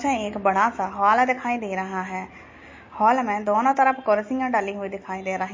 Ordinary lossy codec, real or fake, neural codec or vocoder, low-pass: MP3, 32 kbps; real; none; 7.2 kHz